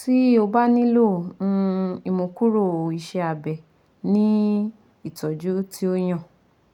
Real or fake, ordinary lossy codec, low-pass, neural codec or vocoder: real; none; 19.8 kHz; none